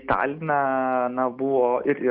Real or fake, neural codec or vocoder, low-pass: real; none; 5.4 kHz